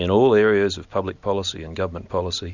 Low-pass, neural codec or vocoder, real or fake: 7.2 kHz; none; real